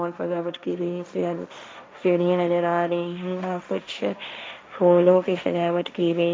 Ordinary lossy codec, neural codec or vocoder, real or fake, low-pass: none; codec, 16 kHz, 1.1 kbps, Voila-Tokenizer; fake; none